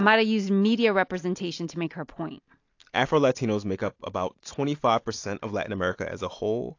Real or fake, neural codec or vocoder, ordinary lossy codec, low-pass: real; none; AAC, 48 kbps; 7.2 kHz